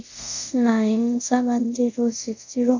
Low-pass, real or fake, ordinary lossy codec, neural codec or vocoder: 7.2 kHz; fake; none; codec, 24 kHz, 0.5 kbps, DualCodec